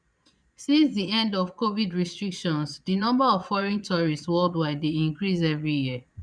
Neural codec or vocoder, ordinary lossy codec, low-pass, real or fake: vocoder, 22.05 kHz, 80 mel bands, Vocos; none; 9.9 kHz; fake